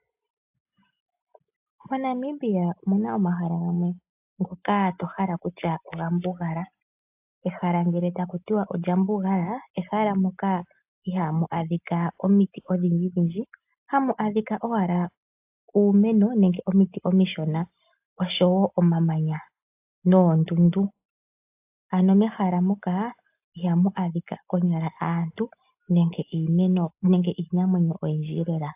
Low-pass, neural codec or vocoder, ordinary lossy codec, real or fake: 3.6 kHz; none; AAC, 32 kbps; real